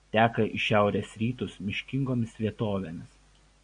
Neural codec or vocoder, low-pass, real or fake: none; 9.9 kHz; real